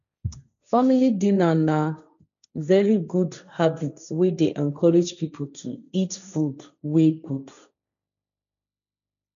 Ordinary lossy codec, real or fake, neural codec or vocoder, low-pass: none; fake; codec, 16 kHz, 1.1 kbps, Voila-Tokenizer; 7.2 kHz